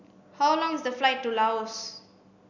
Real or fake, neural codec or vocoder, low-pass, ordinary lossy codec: real; none; 7.2 kHz; none